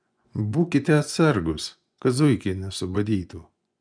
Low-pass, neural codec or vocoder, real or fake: 9.9 kHz; vocoder, 24 kHz, 100 mel bands, Vocos; fake